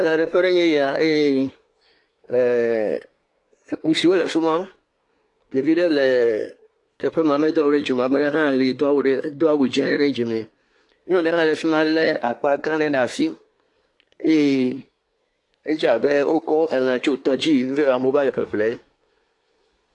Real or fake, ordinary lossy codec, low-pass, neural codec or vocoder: fake; AAC, 48 kbps; 10.8 kHz; codec, 24 kHz, 1 kbps, SNAC